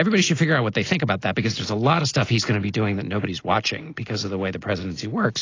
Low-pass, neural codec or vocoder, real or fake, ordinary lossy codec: 7.2 kHz; none; real; AAC, 32 kbps